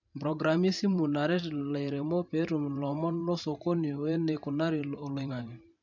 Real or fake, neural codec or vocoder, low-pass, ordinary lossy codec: fake; vocoder, 44.1 kHz, 128 mel bands every 512 samples, BigVGAN v2; 7.2 kHz; none